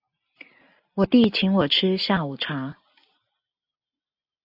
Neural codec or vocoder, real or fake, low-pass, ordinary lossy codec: none; real; 5.4 kHz; AAC, 48 kbps